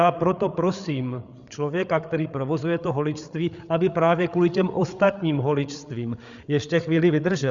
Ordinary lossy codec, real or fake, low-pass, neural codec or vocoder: Opus, 64 kbps; fake; 7.2 kHz; codec, 16 kHz, 8 kbps, FreqCodec, larger model